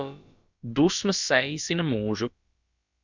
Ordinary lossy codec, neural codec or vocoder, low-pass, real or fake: Opus, 64 kbps; codec, 16 kHz, about 1 kbps, DyCAST, with the encoder's durations; 7.2 kHz; fake